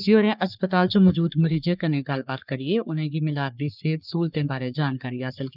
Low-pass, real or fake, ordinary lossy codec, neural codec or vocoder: 5.4 kHz; fake; none; codec, 44.1 kHz, 3.4 kbps, Pupu-Codec